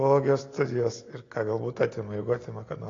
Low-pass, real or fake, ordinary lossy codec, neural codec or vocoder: 7.2 kHz; real; AAC, 32 kbps; none